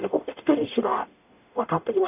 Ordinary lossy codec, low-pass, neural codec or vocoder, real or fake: none; 3.6 kHz; codec, 44.1 kHz, 0.9 kbps, DAC; fake